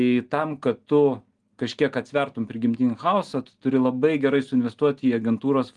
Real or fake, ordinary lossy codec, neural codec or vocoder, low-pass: real; Opus, 24 kbps; none; 10.8 kHz